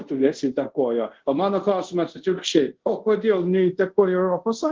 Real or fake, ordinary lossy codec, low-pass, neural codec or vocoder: fake; Opus, 16 kbps; 7.2 kHz; codec, 24 kHz, 0.5 kbps, DualCodec